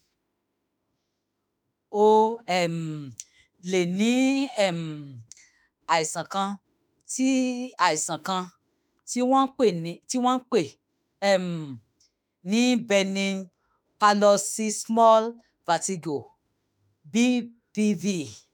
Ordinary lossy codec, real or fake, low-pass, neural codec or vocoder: none; fake; none; autoencoder, 48 kHz, 32 numbers a frame, DAC-VAE, trained on Japanese speech